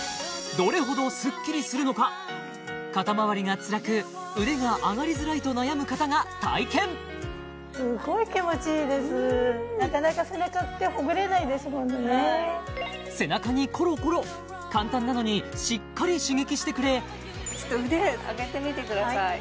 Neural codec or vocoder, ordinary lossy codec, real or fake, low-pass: none; none; real; none